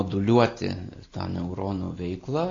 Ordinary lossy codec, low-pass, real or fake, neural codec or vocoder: AAC, 32 kbps; 7.2 kHz; real; none